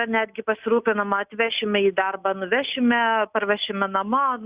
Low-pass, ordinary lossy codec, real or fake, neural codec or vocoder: 3.6 kHz; Opus, 64 kbps; real; none